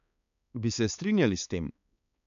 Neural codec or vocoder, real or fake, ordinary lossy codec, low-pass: codec, 16 kHz, 4 kbps, X-Codec, HuBERT features, trained on balanced general audio; fake; none; 7.2 kHz